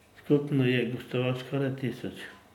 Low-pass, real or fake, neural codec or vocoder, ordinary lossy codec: 19.8 kHz; real; none; none